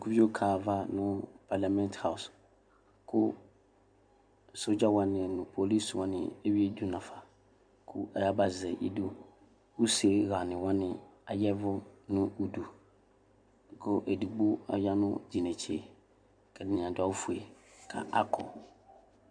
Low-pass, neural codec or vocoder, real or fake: 9.9 kHz; none; real